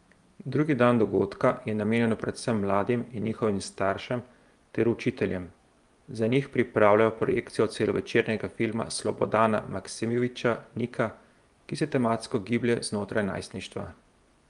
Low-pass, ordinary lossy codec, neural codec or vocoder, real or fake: 10.8 kHz; Opus, 32 kbps; vocoder, 24 kHz, 100 mel bands, Vocos; fake